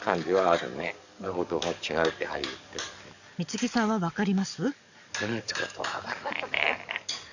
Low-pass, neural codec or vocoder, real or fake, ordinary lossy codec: 7.2 kHz; codec, 44.1 kHz, 7.8 kbps, DAC; fake; none